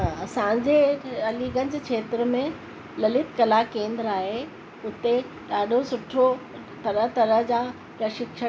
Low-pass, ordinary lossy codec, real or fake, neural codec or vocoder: none; none; real; none